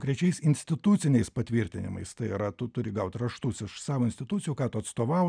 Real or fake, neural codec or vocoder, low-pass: real; none; 9.9 kHz